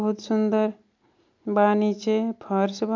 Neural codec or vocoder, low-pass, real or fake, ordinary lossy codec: none; 7.2 kHz; real; MP3, 64 kbps